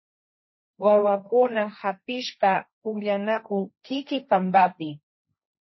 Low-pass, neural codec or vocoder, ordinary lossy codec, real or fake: 7.2 kHz; codec, 16 kHz, 1.1 kbps, Voila-Tokenizer; MP3, 24 kbps; fake